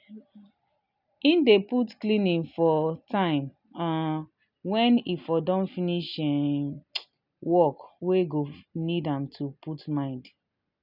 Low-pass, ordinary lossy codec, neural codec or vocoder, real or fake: 5.4 kHz; none; none; real